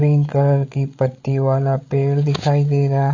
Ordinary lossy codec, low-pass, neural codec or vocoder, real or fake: AAC, 48 kbps; 7.2 kHz; codec, 16 kHz, 8 kbps, FreqCodec, larger model; fake